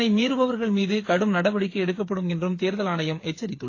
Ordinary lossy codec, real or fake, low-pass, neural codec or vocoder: AAC, 32 kbps; fake; 7.2 kHz; codec, 16 kHz, 8 kbps, FreqCodec, smaller model